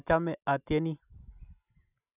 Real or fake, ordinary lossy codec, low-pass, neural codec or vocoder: real; none; 3.6 kHz; none